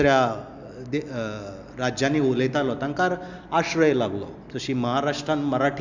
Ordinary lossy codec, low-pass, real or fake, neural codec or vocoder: Opus, 64 kbps; 7.2 kHz; real; none